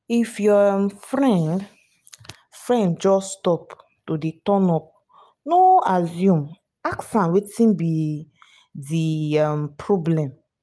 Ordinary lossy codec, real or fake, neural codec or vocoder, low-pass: none; real; none; none